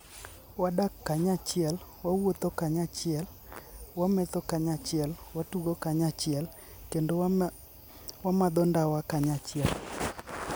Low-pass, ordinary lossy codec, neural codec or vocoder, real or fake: none; none; none; real